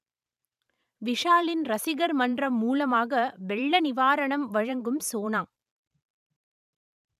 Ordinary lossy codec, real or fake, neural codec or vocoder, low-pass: none; fake; vocoder, 44.1 kHz, 128 mel bands every 512 samples, BigVGAN v2; 14.4 kHz